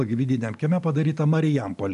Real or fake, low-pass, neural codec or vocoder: real; 10.8 kHz; none